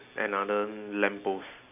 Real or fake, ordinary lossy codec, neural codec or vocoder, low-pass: real; none; none; 3.6 kHz